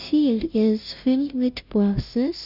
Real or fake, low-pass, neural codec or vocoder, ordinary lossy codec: fake; 5.4 kHz; codec, 16 kHz, 0.5 kbps, FunCodec, trained on Chinese and English, 25 frames a second; none